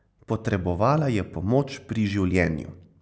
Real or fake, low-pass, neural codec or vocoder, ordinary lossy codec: real; none; none; none